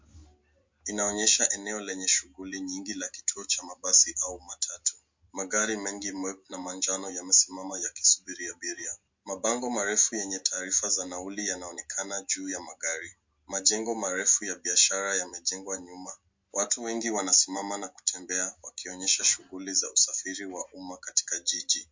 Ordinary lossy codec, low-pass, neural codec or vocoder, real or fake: MP3, 48 kbps; 7.2 kHz; none; real